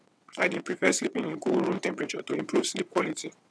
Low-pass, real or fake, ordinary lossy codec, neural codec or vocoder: none; fake; none; vocoder, 22.05 kHz, 80 mel bands, WaveNeXt